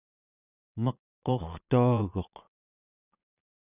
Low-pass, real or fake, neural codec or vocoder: 3.6 kHz; fake; vocoder, 22.05 kHz, 80 mel bands, Vocos